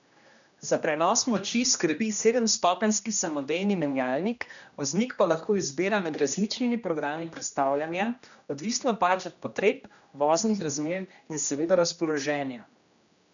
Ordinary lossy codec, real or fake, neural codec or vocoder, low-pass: none; fake; codec, 16 kHz, 1 kbps, X-Codec, HuBERT features, trained on general audio; 7.2 kHz